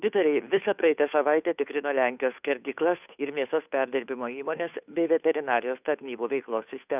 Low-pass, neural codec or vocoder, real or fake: 3.6 kHz; codec, 16 kHz, 2 kbps, FunCodec, trained on Chinese and English, 25 frames a second; fake